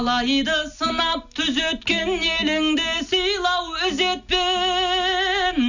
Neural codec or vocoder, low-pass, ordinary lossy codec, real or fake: none; 7.2 kHz; none; real